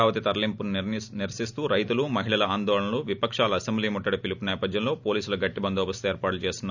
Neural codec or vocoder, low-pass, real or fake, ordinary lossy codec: none; 7.2 kHz; real; none